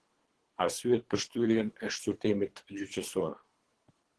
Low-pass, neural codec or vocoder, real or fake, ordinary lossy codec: 10.8 kHz; codec, 24 kHz, 3 kbps, HILCodec; fake; Opus, 16 kbps